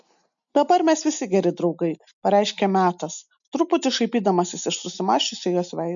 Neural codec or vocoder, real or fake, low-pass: none; real; 7.2 kHz